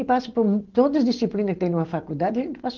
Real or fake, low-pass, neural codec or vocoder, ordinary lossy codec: real; 7.2 kHz; none; Opus, 16 kbps